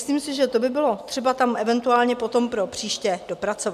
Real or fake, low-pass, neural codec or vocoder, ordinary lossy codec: real; 14.4 kHz; none; MP3, 96 kbps